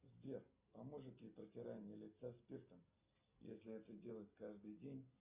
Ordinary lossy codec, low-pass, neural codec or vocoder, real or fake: Opus, 64 kbps; 3.6 kHz; none; real